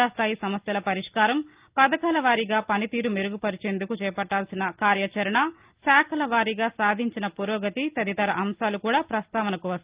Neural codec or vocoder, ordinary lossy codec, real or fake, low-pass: none; Opus, 32 kbps; real; 3.6 kHz